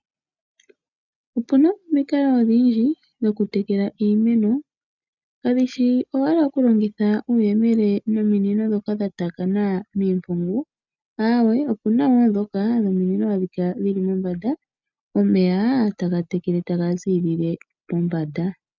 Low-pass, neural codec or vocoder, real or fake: 7.2 kHz; none; real